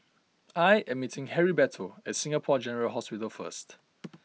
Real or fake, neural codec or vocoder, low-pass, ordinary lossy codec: real; none; none; none